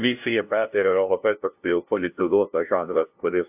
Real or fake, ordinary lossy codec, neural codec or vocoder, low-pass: fake; AAC, 32 kbps; codec, 16 kHz, 0.5 kbps, FunCodec, trained on LibriTTS, 25 frames a second; 3.6 kHz